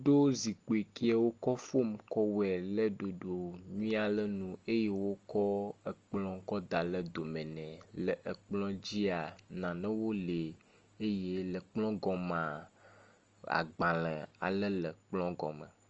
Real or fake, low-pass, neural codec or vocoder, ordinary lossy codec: real; 7.2 kHz; none; Opus, 24 kbps